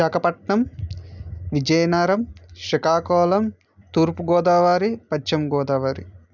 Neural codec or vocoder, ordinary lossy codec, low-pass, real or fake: none; none; 7.2 kHz; real